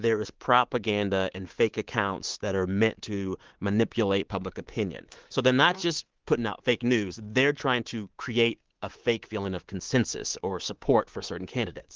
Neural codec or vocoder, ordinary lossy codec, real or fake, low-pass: codec, 24 kHz, 3.1 kbps, DualCodec; Opus, 16 kbps; fake; 7.2 kHz